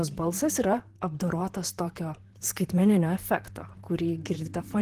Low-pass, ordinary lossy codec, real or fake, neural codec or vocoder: 14.4 kHz; Opus, 16 kbps; fake; vocoder, 44.1 kHz, 128 mel bands, Pupu-Vocoder